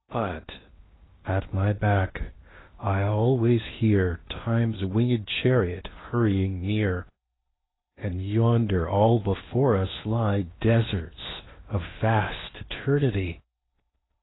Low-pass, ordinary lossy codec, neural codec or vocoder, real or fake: 7.2 kHz; AAC, 16 kbps; codec, 16 kHz in and 24 kHz out, 0.8 kbps, FocalCodec, streaming, 65536 codes; fake